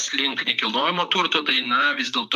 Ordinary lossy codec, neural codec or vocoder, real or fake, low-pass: AAC, 96 kbps; vocoder, 44.1 kHz, 128 mel bands, Pupu-Vocoder; fake; 14.4 kHz